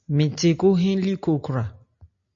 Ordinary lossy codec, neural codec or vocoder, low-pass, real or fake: MP3, 48 kbps; none; 7.2 kHz; real